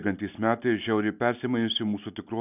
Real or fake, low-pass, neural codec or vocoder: real; 3.6 kHz; none